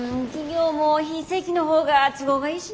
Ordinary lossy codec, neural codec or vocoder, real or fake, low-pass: none; none; real; none